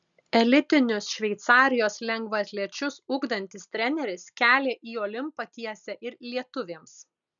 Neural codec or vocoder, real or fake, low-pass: none; real; 7.2 kHz